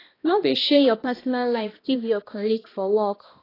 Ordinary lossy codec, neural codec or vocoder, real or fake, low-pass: AAC, 24 kbps; codec, 16 kHz, 1 kbps, X-Codec, HuBERT features, trained on balanced general audio; fake; 5.4 kHz